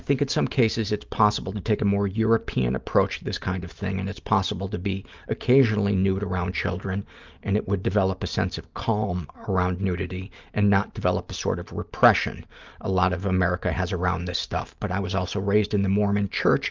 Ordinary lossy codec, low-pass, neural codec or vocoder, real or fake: Opus, 24 kbps; 7.2 kHz; none; real